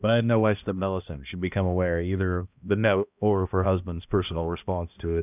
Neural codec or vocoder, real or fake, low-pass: codec, 16 kHz, 1 kbps, X-Codec, HuBERT features, trained on balanced general audio; fake; 3.6 kHz